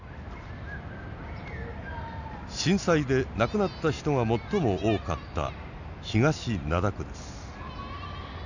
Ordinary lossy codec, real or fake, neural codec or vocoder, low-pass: none; real; none; 7.2 kHz